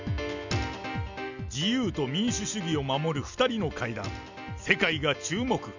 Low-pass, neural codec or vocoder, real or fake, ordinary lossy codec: 7.2 kHz; none; real; none